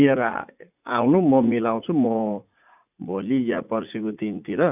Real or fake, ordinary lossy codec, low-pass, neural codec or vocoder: fake; none; 3.6 kHz; vocoder, 44.1 kHz, 80 mel bands, Vocos